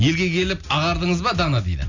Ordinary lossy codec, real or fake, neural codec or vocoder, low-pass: none; real; none; 7.2 kHz